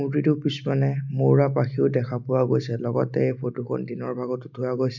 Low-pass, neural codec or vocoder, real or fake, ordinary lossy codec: 7.2 kHz; none; real; none